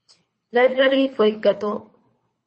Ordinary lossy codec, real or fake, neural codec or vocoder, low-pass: MP3, 32 kbps; fake; codec, 24 kHz, 3 kbps, HILCodec; 10.8 kHz